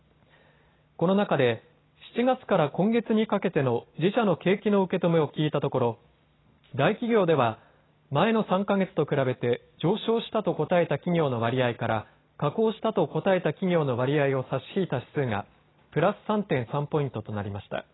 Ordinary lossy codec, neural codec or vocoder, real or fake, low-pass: AAC, 16 kbps; none; real; 7.2 kHz